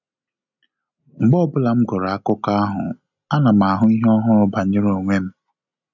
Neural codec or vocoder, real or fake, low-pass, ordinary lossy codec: none; real; 7.2 kHz; none